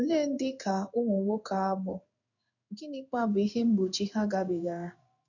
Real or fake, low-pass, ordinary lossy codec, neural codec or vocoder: fake; 7.2 kHz; none; codec, 16 kHz in and 24 kHz out, 1 kbps, XY-Tokenizer